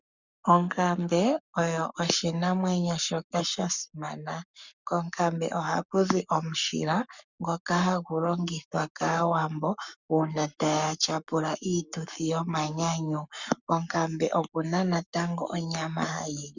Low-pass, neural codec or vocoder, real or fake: 7.2 kHz; codec, 44.1 kHz, 7.8 kbps, Pupu-Codec; fake